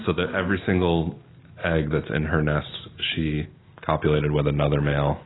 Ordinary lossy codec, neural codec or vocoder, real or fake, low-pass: AAC, 16 kbps; none; real; 7.2 kHz